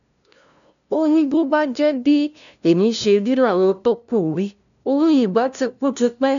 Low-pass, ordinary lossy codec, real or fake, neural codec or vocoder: 7.2 kHz; none; fake; codec, 16 kHz, 0.5 kbps, FunCodec, trained on LibriTTS, 25 frames a second